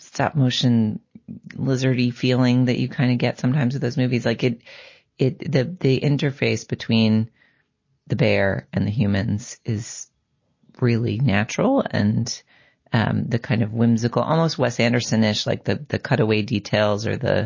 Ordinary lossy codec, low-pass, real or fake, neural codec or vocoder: MP3, 32 kbps; 7.2 kHz; real; none